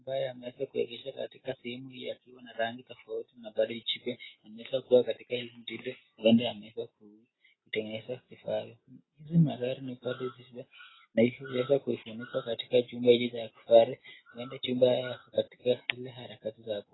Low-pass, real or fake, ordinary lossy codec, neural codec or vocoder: 7.2 kHz; real; AAC, 16 kbps; none